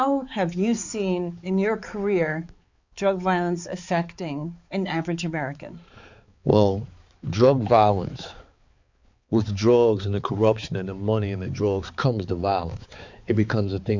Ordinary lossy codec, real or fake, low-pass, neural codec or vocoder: Opus, 64 kbps; fake; 7.2 kHz; codec, 16 kHz, 4 kbps, X-Codec, HuBERT features, trained on balanced general audio